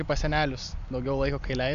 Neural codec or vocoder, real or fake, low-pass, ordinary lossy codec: none; real; 7.2 kHz; MP3, 96 kbps